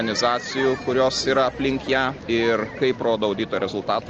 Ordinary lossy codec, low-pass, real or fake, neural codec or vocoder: Opus, 24 kbps; 7.2 kHz; real; none